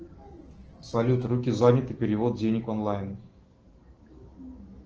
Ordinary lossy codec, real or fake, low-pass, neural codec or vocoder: Opus, 16 kbps; fake; 7.2 kHz; autoencoder, 48 kHz, 128 numbers a frame, DAC-VAE, trained on Japanese speech